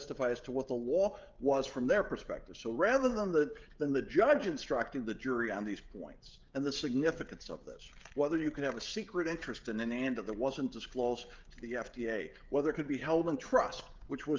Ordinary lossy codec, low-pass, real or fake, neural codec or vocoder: Opus, 24 kbps; 7.2 kHz; fake; codec, 16 kHz, 16 kbps, FreqCodec, smaller model